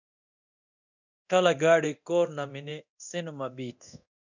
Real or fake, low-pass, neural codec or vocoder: fake; 7.2 kHz; codec, 24 kHz, 0.9 kbps, DualCodec